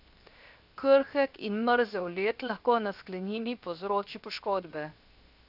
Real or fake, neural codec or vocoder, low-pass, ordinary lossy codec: fake; codec, 16 kHz, 0.7 kbps, FocalCodec; 5.4 kHz; none